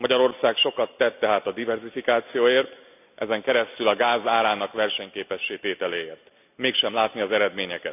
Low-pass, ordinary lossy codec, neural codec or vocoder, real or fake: 3.6 kHz; none; none; real